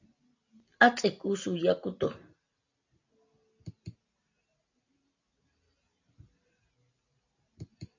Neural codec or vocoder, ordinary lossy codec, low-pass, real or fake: none; AAC, 48 kbps; 7.2 kHz; real